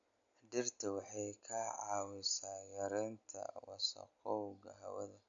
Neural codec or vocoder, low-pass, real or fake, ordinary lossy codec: none; 7.2 kHz; real; none